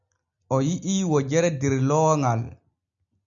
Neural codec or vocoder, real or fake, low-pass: none; real; 7.2 kHz